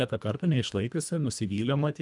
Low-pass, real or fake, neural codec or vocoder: 10.8 kHz; fake; codec, 24 kHz, 1.5 kbps, HILCodec